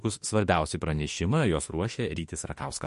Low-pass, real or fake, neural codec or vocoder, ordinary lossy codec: 14.4 kHz; fake; autoencoder, 48 kHz, 32 numbers a frame, DAC-VAE, trained on Japanese speech; MP3, 48 kbps